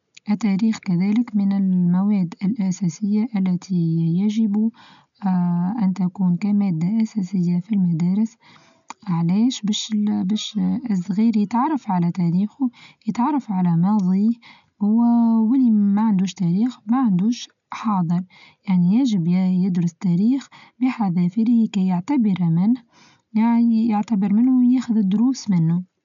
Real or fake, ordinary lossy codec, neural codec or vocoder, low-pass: real; none; none; 7.2 kHz